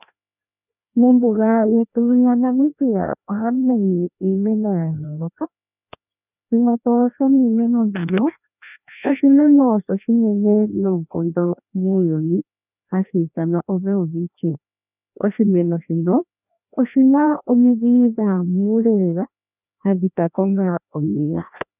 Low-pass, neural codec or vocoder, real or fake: 3.6 kHz; codec, 16 kHz, 1 kbps, FreqCodec, larger model; fake